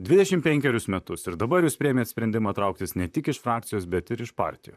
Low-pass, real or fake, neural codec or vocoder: 14.4 kHz; fake; vocoder, 44.1 kHz, 128 mel bands, Pupu-Vocoder